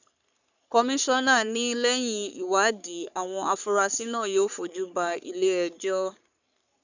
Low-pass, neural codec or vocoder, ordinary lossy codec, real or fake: 7.2 kHz; codec, 44.1 kHz, 3.4 kbps, Pupu-Codec; none; fake